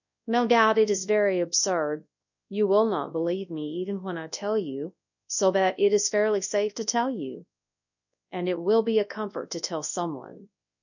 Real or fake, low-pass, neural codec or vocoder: fake; 7.2 kHz; codec, 24 kHz, 0.9 kbps, WavTokenizer, large speech release